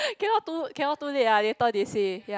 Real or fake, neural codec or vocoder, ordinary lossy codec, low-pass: real; none; none; none